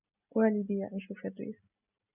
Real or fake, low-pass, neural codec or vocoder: real; 3.6 kHz; none